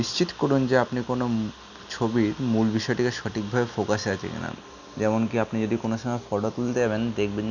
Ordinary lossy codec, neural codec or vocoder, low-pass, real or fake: none; none; 7.2 kHz; real